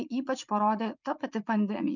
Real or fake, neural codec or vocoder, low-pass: fake; vocoder, 44.1 kHz, 80 mel bands, Vocos; 7.2 kHz